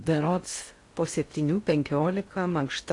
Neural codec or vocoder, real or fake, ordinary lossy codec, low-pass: codec, 16 kHz in and 24 kHz out, 0.6 kbps, FocalCodec, streaming, 4096 codes; fake; AAC, 48 kbps; 10.8 kHz